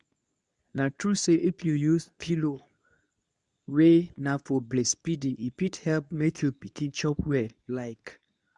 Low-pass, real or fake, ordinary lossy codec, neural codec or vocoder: 10.8 kHz; fake; none; codec, 24 kHz, 0.9 kbps, WavTokenizer, medium speech release version 2